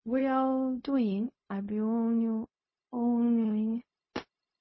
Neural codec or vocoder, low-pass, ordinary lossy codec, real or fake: codec, 16 kHz, 0.4 kbps, LongCat-Audio-Codec; 7.2 kHz; MP3, 24 kbps; fake